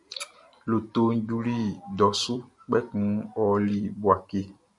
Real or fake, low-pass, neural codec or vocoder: real; 10.8 kHz; none